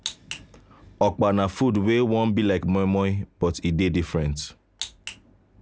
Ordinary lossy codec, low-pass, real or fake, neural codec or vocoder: none; none; real; none